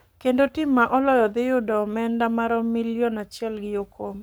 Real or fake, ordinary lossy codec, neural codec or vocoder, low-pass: fake; none; codec, 44.1 kHz, 7.8 kbps, Pupu-Codec; none